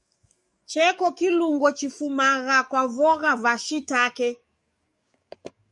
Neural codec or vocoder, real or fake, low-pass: codec, 44.1 kHz, 7.8 kbps, DAC; fake; 10.8 kHz